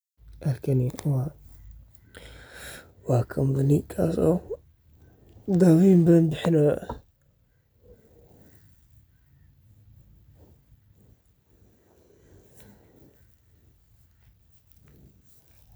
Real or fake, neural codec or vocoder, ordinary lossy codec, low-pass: real; none; none; none